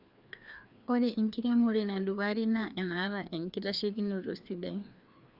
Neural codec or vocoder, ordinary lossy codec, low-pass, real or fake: codec, 16 kHz, 2 kbps, FreqCodec, larger model; Opus, 64 kbps; 5.4 kHz; fake